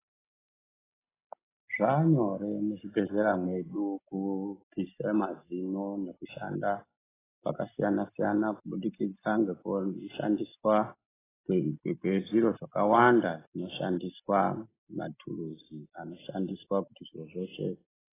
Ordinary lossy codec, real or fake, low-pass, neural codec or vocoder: AAC, 16 kbps; real; 3.6 kHz; none